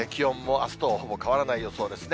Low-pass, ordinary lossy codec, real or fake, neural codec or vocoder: none; none; real; none